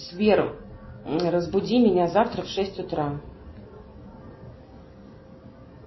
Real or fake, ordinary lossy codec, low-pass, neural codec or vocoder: real; MP3, 24 kbps; 7.2 kHz; none